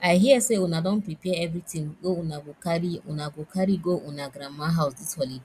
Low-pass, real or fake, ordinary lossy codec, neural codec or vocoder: 14.4 kHz; real; none; none